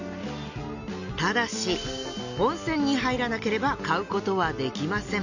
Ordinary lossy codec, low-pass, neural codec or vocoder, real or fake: none; 7.2 kHz; none; real